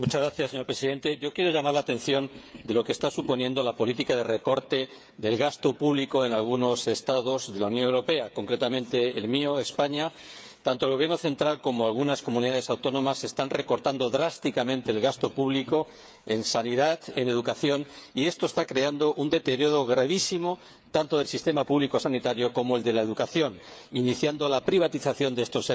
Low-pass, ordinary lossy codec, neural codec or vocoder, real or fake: none; none; codec, 16 kHz, 8 kbps, FreqCodec, smaller model; fake